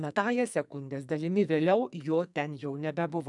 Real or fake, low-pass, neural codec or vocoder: fake; 10.8 kHz; codec, 44.1 kHz, 2.6 kbps, SNAC